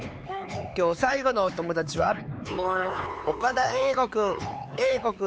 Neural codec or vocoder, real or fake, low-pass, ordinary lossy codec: codec, 16 kHz, 4 kbps, X-Codec, HuBERT features, trained on LibriSpeech; fake; none; none